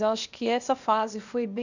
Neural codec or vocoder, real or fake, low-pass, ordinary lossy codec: codec, 16 kHz, 0.8 kbps, ZipCodec; fake; 7.2 kHz; none